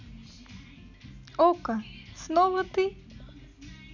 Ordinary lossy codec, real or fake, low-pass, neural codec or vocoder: none; real; 7.2 kHz; none